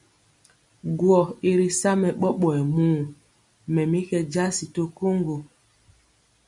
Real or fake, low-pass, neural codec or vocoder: real; 10.8 kHz; none